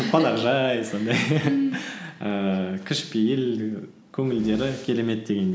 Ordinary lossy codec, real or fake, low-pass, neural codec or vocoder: none; real; none; none